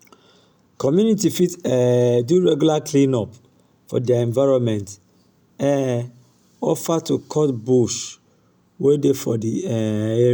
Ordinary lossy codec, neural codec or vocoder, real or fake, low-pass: none; none; real; none